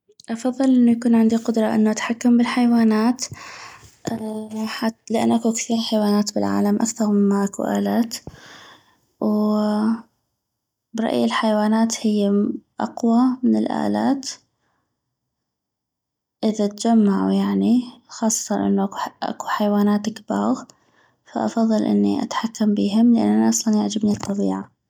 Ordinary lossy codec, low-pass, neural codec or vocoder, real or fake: none; 19.8 kHz; none; real